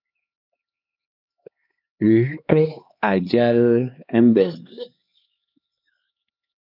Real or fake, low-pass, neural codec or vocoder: fake; 5.4 kHz; codec, 16 kHz, 2 kbps, X-Codec, HuBERT features, trained on LibriSpeech